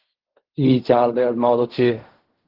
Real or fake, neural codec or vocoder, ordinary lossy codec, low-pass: fake; codec, 16 kHz in and 24 kHz out, 0.4 kbps, LongCat-Audio-Codec, fine tuned four codebook decoder; Opus, 16 kbps; 5.4 kHz